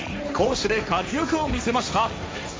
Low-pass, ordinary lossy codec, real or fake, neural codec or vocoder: none; none; fake; codec, 16 kHz, 1.1 kbps, Voila-Tokenizer